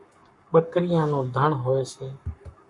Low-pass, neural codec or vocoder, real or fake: 10.8 kHz; codec, 44.1 kHz, 7.8 kbps, Pupu-Codec; fake